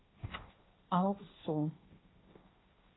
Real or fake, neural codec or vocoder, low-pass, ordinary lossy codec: fake; codec, 16 kHz, 1.1 kbps, Voila-Tokenizer; 7.2 kHz; AAC, 16 kbps